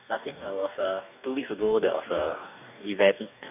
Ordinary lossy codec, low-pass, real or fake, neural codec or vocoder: none; 3.6 kHz; fake; codec, 44.1 kHz, 2.6 kbps, DAC